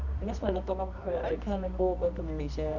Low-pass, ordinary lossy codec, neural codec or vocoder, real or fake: 7.2 kHz; none; codec, 24 kHz, 0.9 kbps, WavTokenizer, medium music audio release; fake